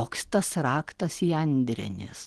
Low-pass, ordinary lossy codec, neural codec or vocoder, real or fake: 10.8 kHz; Opus, 16 kbps; none; real